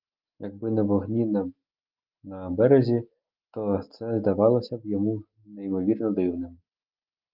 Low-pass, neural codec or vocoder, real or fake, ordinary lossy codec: 5.4 kHz; none; real; Opus, 32 kbps